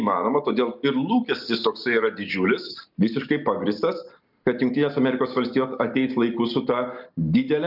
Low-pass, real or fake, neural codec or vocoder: 5.4 kHz; real; none